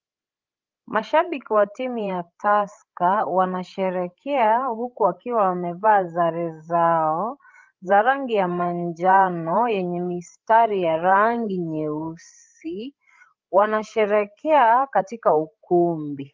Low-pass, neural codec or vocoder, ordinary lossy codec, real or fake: 7.2 kHz; codec, 16 kHz, 16 kbps, FreqCodec, larger model; Opus, 32 kbps; fake